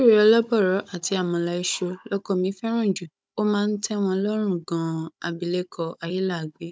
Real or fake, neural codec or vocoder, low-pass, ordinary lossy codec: fake; codec, 16 kHz, 16 kbps, FunCodec, trained on Chinese and English, 50 frames a second; none; none